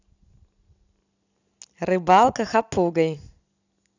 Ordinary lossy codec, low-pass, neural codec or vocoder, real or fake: none; 7.2 kHz; none; real